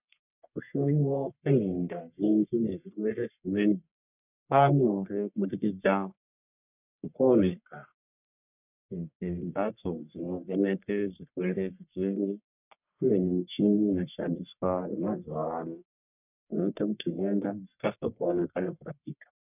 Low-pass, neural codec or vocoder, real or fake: 3.6 kHz; codec, 44.1 kHz, 1.7 kbps, Pupu-Codec; fake